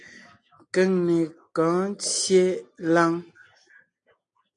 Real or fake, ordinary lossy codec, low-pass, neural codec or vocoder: real; AAC, 48 kbps; 9.9 kHz; none